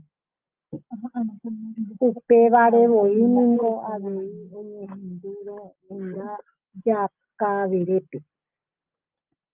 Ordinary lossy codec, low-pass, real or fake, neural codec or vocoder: Opus, 24 kbps; 3.6 kHz; real; none